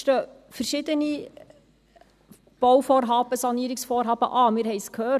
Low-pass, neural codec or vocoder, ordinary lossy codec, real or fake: 14.4 kHz; none; none; real